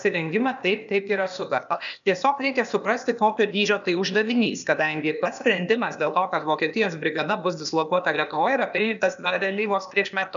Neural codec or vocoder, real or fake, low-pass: codec, 16 kHz, 0.8 kbps, ZipCodec; fake; 7.2 kHz